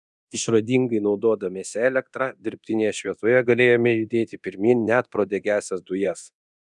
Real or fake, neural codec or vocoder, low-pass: fake; codec, 24 kHz, 0.9 kbps, DualCodec; 10.8 kHz